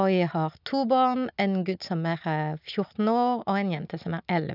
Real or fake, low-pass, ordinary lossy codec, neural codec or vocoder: real; 5.4 kHz; none; none